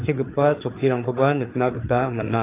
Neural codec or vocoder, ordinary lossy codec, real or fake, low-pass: codec, 16 kHz, 4 kbps, FreqCodec, larger model; AAC, 24 kbps; fake; 3.6 kHz